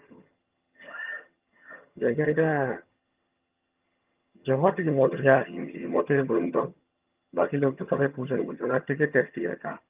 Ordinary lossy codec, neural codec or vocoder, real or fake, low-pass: Opus, 32 kbps; vocoder, 22.05 kHz, 80 mel bands, HiFi-GAN; fake; 3.6 kHz